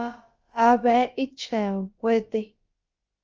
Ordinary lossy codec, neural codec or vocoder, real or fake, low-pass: Opus, 24 kbps; codec, 16 kHz, about 1 kbps, DyCAST, with the encoder's durations; fake; 7.2 kHz